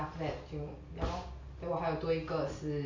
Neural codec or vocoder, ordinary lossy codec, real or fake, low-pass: none; MP3, 64 kbps; real; 7.2 kHz